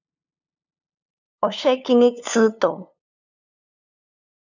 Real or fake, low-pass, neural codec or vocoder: fake; 7.2 kHz; codec, 16 kHz, 2 kbps, FunCodec, trained on LibriTTS, 25 frames a second